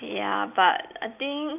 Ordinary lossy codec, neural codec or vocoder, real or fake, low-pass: none; none; real; 3.6 kHz